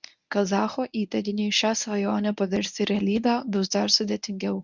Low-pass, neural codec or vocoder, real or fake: 7.2 kHz; codec, 24 kHz, 0.9 kbps, WavTokenizer, medium speech release version 1; fake